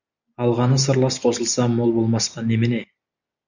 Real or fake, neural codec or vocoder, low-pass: real; none; 7.2 kHz